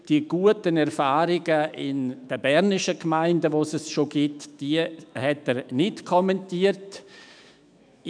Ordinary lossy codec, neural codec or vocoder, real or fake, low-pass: none; autoencoder, 48 kHz, 128 numbers a frame, DAC-VAE, trained on Japanese speech; fake; 9.9 kHz